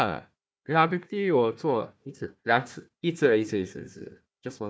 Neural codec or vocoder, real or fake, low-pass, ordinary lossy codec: codec, 16 kHz, 1 kbps, FunCodec, trained on Chinese and English, 50 frames a second; fake; none; none